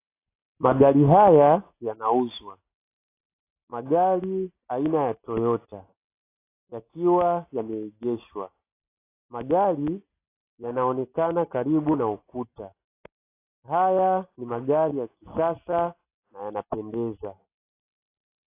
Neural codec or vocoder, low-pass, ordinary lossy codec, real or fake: none; 3.6 kHz; AAC, 24 kbps; real